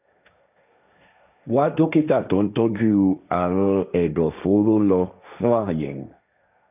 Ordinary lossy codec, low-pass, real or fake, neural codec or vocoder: none; 3.6 kHz; fake; codec, 16 kHz, 1.1 kbps, Voila-Tokenizer